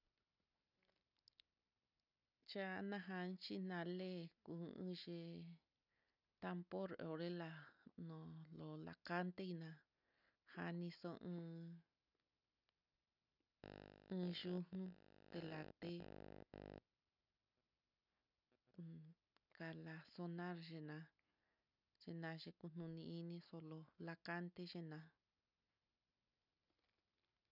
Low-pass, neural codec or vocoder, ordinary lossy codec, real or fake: 5.4 kHz; none; none; real